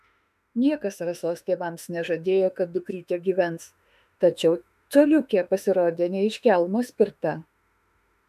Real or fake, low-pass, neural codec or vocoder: fake; 14.4 kHz; autoencoder, 48 kHz, 32 numbers a frame, DAC-VAE, trained on Japanese speech